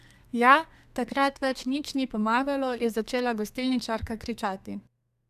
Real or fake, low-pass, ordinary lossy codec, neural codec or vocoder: fake; 14.4 kHz; none; codec, 32 kHz, 1.9 kbps, SNAC